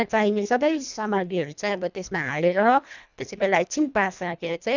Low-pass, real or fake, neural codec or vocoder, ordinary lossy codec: 7.2 kHz; fake; codec, 24 kHz, 1.5 kbps, HILCodec; none